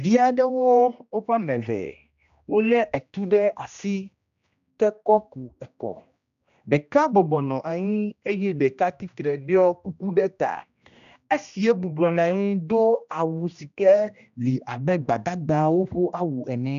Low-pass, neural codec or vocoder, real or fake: 7.2 kHz; codec, 16 kHz, 1 kbps, X-Codec, HuBERT features, trained on general audio; fake